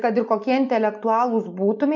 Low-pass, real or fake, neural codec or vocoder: 7.2 kHz; real; none